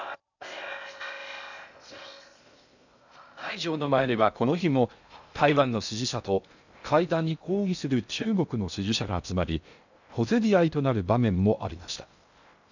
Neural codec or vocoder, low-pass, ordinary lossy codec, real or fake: codec, 16 kHz in and 24 kHz out, 0.6 kbps, FocalCodec, streaming, 2048 codes; 7.2 kHz; none; fake